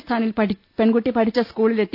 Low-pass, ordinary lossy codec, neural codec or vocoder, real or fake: 5.4 kHz; none; none; real